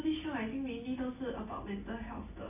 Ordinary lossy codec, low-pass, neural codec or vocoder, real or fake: AAC, 24 kbps; 3.6 kHz; none; real